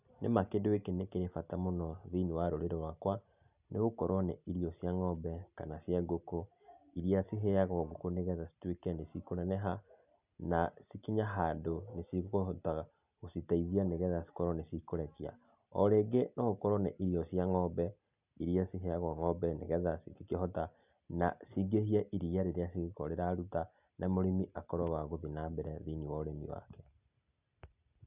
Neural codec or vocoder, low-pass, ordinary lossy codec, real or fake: none; 3.6 kHz; none; real